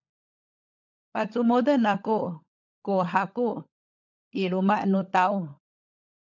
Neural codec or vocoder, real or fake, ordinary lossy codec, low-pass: codec, 16 kHz, 16 kbps, FunCodec, trained on LibriTTS, 50 frames a second; fake; MP3, 64 kbps; 7.2 kHz